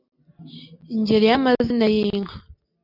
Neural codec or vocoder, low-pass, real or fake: none; 5.4 kHz; real